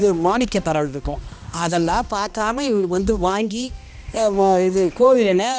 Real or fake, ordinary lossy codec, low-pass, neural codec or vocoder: fake; none; none; codec, 16 kHz, 1 kbps, X-Codec, HuBERT features, trained on balanced general audio